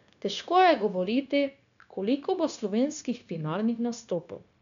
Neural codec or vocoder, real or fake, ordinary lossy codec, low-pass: codec, 16 kHz, 0.9 kbps, LongCat-Audio-Codec; fake; none; 7.2 kHz